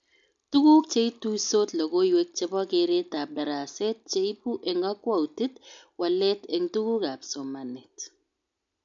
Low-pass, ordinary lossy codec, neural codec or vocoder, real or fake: 7.2 kHz; MP3, 64 kbps; none; real